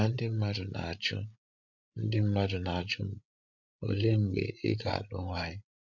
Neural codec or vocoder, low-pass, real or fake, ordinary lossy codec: codec, 16 kHz, 16 kbps, FreqCodec, larger model; 7.2 kHz; fake; none